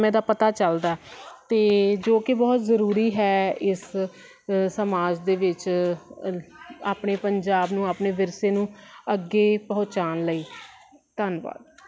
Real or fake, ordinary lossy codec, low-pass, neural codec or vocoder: real; none; none; none